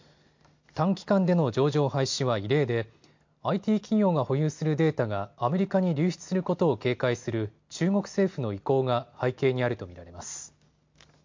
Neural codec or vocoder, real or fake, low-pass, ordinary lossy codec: none; real; 7.2 kHz; MP3, 48 kbps